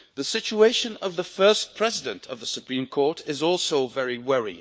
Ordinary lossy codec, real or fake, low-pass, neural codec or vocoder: none; fake; none; codec, 16 kHz, 4 kbps, FunCodec, trained on LibriTTS, 50 frames a second